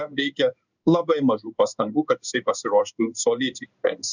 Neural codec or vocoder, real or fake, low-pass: codec, 16 kHz in and 24 kHz out, 1 kbps, XY-Tokenizer; fake; 7.2 kHz